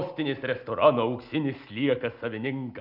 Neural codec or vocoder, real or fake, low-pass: none; real; 5.4 kHz